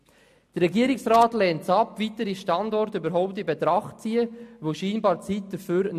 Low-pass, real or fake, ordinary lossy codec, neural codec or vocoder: 14.4 kHz; real; MP3, 64 kbps; none